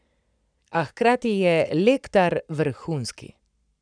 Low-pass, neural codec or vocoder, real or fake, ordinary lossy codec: 9.9 kHz; codec, 44.1 kHz, 7.8 kbps, Pupu-Codec; fake; none